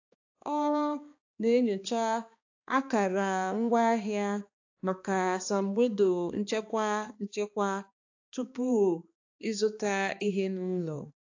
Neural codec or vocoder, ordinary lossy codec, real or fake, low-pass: codec, 16 kHz, 2 kbps, X-Codec, HuBERT features, trained on balanced general audio; AAC, 48 kbps; fake; 7.2 kHz